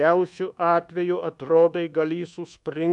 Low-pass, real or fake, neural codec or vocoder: 10.8 kHz; fake; codec, 24 kHz, 1.2 kbps, DualCodec